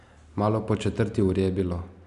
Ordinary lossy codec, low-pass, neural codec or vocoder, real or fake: none; 10.8 kHz; none; real